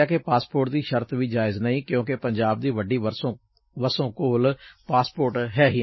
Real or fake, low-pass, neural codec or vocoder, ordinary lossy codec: real; 7.2 kHz; none; MP3, 24 kbps